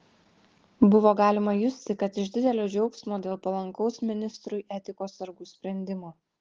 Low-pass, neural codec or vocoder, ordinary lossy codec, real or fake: 7.2 kHz; none; Opus, 16 kbps; real